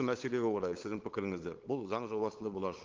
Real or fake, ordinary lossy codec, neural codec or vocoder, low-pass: fake; Opus, 16 kbps; codec, 16 kHz, 8 kbps, FunCodec, trained on LibriTTS, 25 frames a second; 7.2 kHz